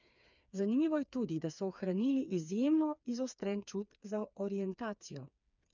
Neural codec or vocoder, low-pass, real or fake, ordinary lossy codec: codec, 16 kHz, 4 kbps, FreqCodec, smaller model; 7.2 kHz; fake; none